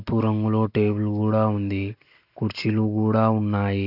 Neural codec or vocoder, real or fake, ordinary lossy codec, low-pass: none; real; none; 5.4 kHz